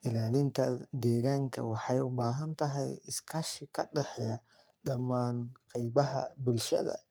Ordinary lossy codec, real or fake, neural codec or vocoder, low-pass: none; fake; codec, 44.1 kHz, 3.4 kbps, Pupu-Codec; none